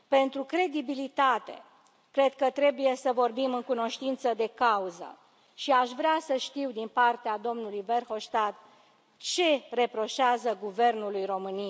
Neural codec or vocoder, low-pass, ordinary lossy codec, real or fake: none; none; none; real